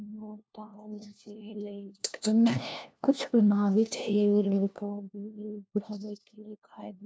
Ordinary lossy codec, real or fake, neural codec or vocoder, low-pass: none; fake; codec, 16 kHz, 1 kbps, FunCodec, trained on LibriTTS, 50 frames a second; none